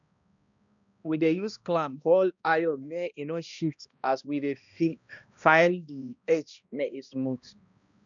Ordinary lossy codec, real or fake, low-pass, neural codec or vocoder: none; fake; 7.2 kHz; codec, 16 kHz, 1 kbps, X-Codec, HuBERT features, trained on balanced general audio